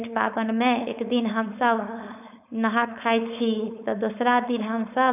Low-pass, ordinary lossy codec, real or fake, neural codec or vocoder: 3.6 kHz; none; fake; codec, 16 kHz, 4.8 kbps, FACodec